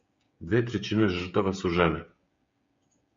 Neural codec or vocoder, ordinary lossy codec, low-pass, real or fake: codec, 16 kHz, 16 kbps, FreqCodec, smaller model; MP3, 48 kbps; 7.2 kHz; fake